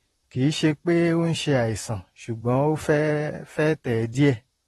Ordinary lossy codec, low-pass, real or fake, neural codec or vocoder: AAC, 32 kbps; 19.8 kHz; fake; vocoder, 44.1 kHz, 128 mel bands, Pupu-Vocoder